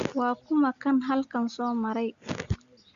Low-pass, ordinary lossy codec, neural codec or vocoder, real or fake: 7.2 kHz; Opus, 64 kbps; none; real